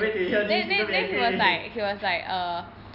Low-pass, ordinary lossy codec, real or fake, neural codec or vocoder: 5.4 kHz; none; real; none